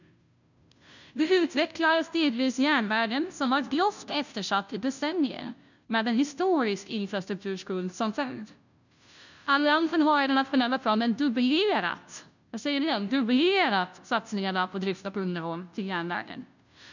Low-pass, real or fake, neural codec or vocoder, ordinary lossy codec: 7.2 kHz; fake; codec, 16 kHz, 0.5 kbps, FunCodec, trained on Chinese and English, 25 frames a second; none